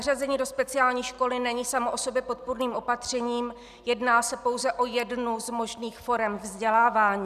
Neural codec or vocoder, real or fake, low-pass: none; real; 14.4 kHz